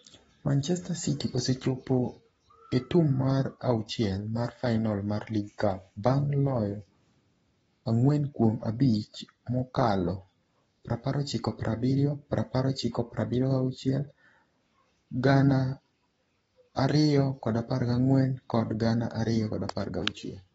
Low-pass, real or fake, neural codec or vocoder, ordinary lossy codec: 19.8 kHz; fake; codec, 44.1 kHz, 7.8 kbps, Pupu-Codec; AAC, 24 kbps